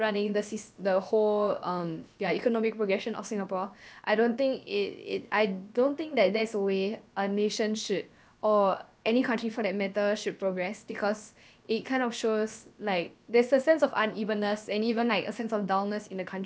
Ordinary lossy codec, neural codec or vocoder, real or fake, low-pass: none; codec, 16 kHz, about 1 kbps, DyCAST, with the encoder's durations; fake; none